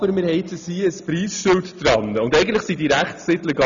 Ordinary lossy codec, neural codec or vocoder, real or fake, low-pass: none; none; real; 7.2 kHz